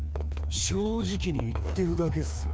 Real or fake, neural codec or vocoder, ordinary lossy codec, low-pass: fake; codec, 16 kHz, 2 kbps, FreqCodec, larger model; none; none